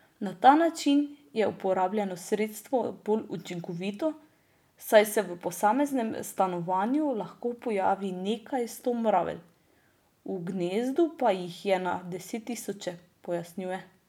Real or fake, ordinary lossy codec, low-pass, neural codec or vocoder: real; none; 19.8 kHz; none